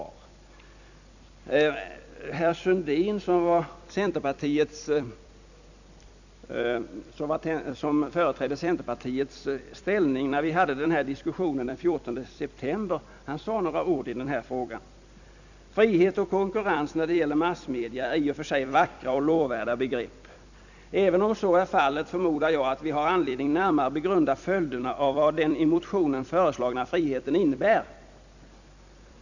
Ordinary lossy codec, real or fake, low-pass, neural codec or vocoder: none; fake; 7.2 kHz; vocoder, 44.1 kHz, 128 mel bands every 256 samples, BigVGAN v2